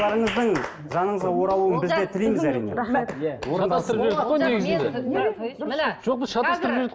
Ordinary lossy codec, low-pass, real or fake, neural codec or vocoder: none; none; real; none